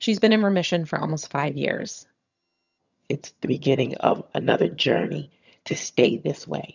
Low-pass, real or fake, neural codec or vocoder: 7.2 kHz; fake; vocoder, 22.05 kHz, 80 mel bands, HiFi-GAN